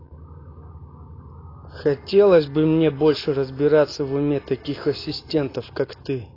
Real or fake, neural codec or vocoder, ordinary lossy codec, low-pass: real; none; AAC, 24 kbps; 5.4 kHz